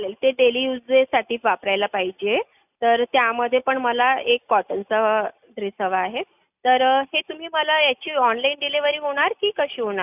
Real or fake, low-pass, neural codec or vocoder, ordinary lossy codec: real; 3.6 kHz; none; none